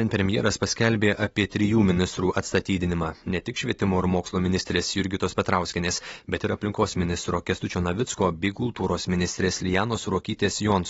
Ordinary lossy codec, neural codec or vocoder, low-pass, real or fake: AAC, 24 kbps; none; 19.8 kHz; real